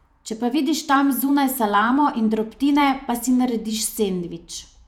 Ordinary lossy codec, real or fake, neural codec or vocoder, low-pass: none; fake; vocoder, 48 kHz, 128 mel bands, Vocos; 19.8 kHz